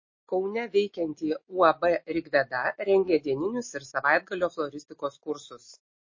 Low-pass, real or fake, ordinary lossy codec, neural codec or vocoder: 7.2 kHz; real; MP3, 32 kbps; none